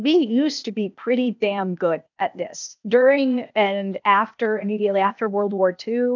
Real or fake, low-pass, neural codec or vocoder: fake; 7.2 kHz; codec, 16 kHz, 0.8 kbps, ZipCodec